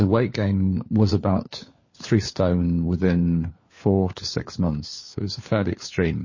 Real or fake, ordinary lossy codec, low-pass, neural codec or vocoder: fake; MP3, 32 kbps; 7.2 kHz; codec, 16 kHz, 4 kbps, FunCodec, trained on LibriTTS, 50 frames a second